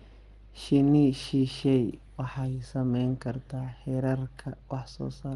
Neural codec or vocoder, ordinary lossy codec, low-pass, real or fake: none; Opus, 24 kbps; 10.8 kHz; real